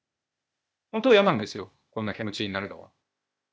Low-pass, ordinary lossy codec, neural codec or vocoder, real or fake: none; none; codec, 16 kHz, 0.8 kbps, ZipCodec; fake